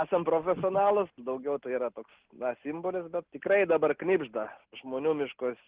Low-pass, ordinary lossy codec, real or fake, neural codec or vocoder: 3.6 kHz; Opus, 16 kbps; real; none